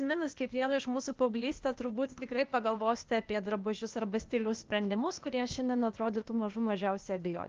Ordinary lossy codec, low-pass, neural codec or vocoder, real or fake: Opus, 24 kbps; 7.2 kHz; codec, 16 kHz, 0.8 kbps, ZipCodec; fake